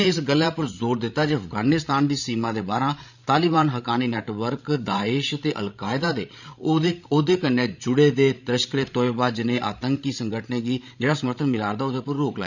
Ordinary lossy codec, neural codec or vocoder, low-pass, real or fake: none; codec, 16 kHz, 16 kbps, FreqCodec, larger model; 7.2 kHz; fake